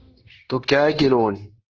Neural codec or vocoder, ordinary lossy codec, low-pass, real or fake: codec, 16 kHz, 0.9 kbps, LongCat-Audio-Codec; Opus, 16 kbps; 7.2 kHz; fake